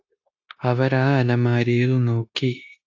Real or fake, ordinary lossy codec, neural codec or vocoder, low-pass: fake; AAC, 48 kbps; codec, 16 kHz, 0.9 kbps, LongCat-Audio-Codec; 7.2 kHz